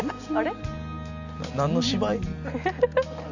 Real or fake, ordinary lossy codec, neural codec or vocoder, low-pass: real; none; none; 7.2 kHz